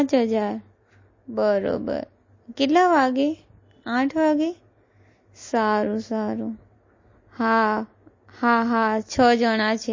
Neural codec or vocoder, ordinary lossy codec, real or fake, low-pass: none; MP3, 32 kbps; real; 7.2 kHz